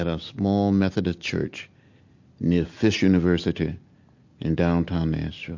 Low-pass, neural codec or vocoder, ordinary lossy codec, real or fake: 7.2 kHz; none; MP3, 48 kbps; real